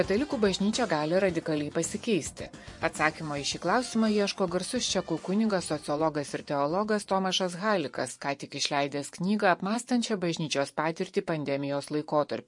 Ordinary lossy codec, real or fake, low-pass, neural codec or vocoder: MP3, 48 kbps; fake; 10.8 kHz; vocoder, 24 kHz, 100 mel bands, Vocos